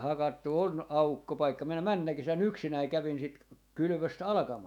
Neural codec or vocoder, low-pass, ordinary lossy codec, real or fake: autoencoder, 48 kHz, 128 numbers a frame, DAC-VAE, trained on Japanese speech; 19.8 kHz; none; fake